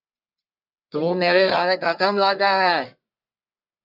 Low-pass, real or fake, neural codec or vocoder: 5.4 kHz; fake; codec, 44.1 kHz, 1.7 kbps, Pupu-Codec